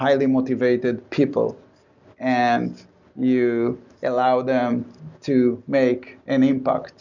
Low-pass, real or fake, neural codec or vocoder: 7.2 kHz; real; none